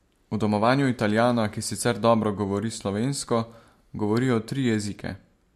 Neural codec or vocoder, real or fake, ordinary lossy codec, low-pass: none; real; MP3, 64 kbps; 14.4 kHz